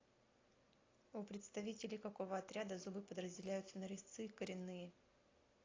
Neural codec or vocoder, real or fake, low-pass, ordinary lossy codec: none; real; 7.2 kHz; AAC, 32 kbps